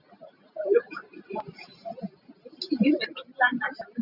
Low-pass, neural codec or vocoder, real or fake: 5.4 kHz; none; real